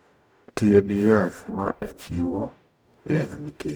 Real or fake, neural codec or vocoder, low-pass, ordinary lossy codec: fake; codec, 44.1 kHz, 0.9 kbps, DAC; none; none